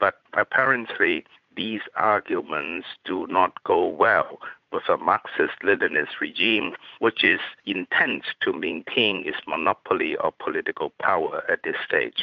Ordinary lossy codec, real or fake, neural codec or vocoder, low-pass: MP3, 64 kbps; fake; vocoder, 44.1 kHz, 80 mel bands, Vocos; 7.2 kHz